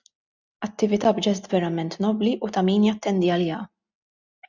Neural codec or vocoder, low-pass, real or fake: none; 7.2 kHz; real